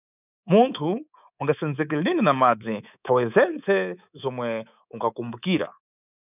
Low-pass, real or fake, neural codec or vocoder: 3.6 kHz; fake; codec, 24 kHz, 3.1 kbps, DualCodec